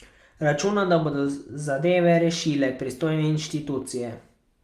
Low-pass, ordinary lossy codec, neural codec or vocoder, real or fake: 14.4 kHz; Opus, 32 kbps; none; real